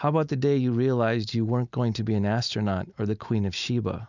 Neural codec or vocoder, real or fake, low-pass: none; real; 7.2 kHz